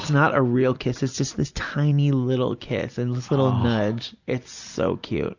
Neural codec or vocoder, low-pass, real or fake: none; 7.2 kHz; real